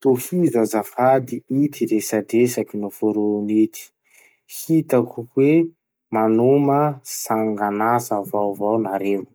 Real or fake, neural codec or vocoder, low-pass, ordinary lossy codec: real; none; none; none